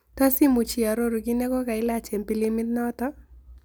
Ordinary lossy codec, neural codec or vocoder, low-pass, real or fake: none; none; none; real